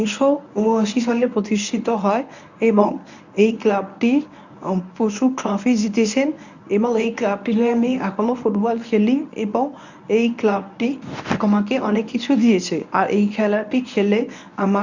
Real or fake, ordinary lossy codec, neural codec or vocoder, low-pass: fake; none; codec, 24 kHz, 0.9 kbps, WavTokenizer, medium speech release version 1; 7.2 kHz